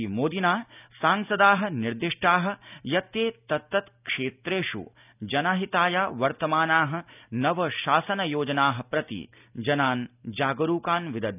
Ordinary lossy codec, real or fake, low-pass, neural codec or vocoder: none; real; 3.6 kHz; none